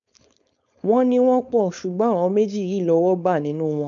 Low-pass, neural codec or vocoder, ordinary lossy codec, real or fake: 7.2 kHz; codec, 16 kHz, 4.8 kbps, FACodec; none; fake